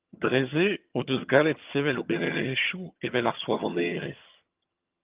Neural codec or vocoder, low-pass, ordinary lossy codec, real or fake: vocoder, 22.05 kHz, 80 mel bands, HiFi-GAN; 3.6 kHz; Opus, 32 kbps; fake